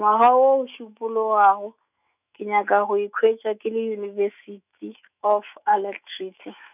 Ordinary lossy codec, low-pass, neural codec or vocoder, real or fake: none; 3.6 kHz; autoencoder, 48 kHz, 128 numbers a frame, DAC-VAE, trained on Japanese speech; fake